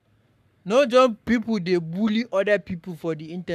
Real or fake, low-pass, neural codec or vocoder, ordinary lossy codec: fake; 14.4 kHz; codec, 44.1 kHz, 7.8 kbps, Pupu-Codec; none